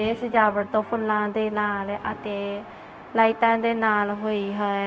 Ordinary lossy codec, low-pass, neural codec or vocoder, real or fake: none; none; codec, 16 kHz, 0.4 kbps, LongCat-Audio-Codec; fake